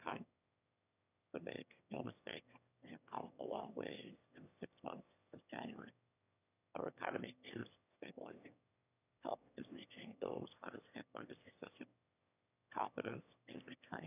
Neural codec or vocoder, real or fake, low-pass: autoencoder, 22.05 kHz, a latent of 192 numbers a frame, VITS, trained on one speaker; fake; 3.6 kHz